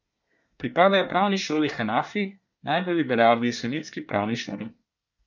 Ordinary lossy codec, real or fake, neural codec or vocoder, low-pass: none; fake; codec, 24 kHz, 1 kbps, SNAC; 7.2 kHz